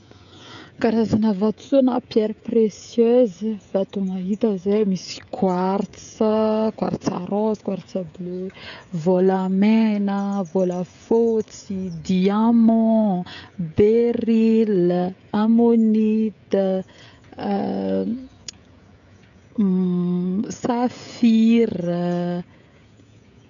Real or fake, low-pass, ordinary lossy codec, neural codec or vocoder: fake; 7.2 kHz; none; codec, 16 kHz, 16 kbps, FreqCodec, smaller model